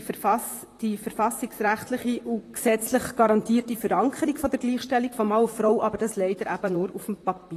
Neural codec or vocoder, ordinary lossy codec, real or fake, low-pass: vocoder, 44.1 kHz, 128 mel bands, Pupu-Vocoder; AAC, 48 kbps; fake; 14.4 kHz